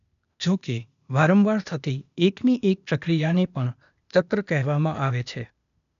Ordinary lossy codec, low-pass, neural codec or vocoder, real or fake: none; 7.2 kHz; codec, 16 kHz, 0.8 kbps, ZipCodec; fake